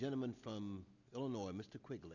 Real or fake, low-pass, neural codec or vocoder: real; 7.2 kHz; none